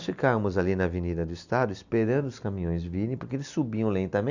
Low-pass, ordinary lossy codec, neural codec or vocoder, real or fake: 7.2 kHz; none; none; real